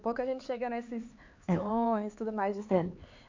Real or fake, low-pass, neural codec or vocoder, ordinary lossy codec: fake; 7.2 kHz; codec, 16 kHz, 4 kbps, X-Codec, HuBERT features, trained on LibriSpeech; MP3, 48 kbps